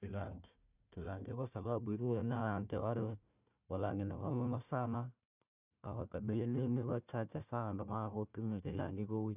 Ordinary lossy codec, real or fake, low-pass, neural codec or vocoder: none; fake; 3.6 kHz; codec, 16 kHz, 1 kbps, FunCodec, trained on Chinese and English, 50 frames a second